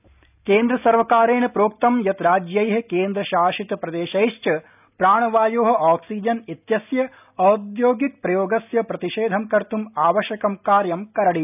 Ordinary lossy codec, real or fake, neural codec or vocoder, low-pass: none; real; none; 3.6 kHz